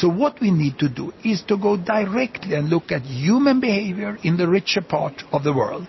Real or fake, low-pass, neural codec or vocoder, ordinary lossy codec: real; 7.2 kHz; none; MP3, 24 kbps